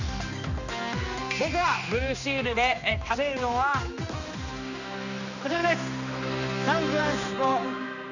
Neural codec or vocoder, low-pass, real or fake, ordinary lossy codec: codec, 16 kHz, 2 kbps, X-Codec, HuBERT features, trained on general audio; 7.2 kHz; fake; none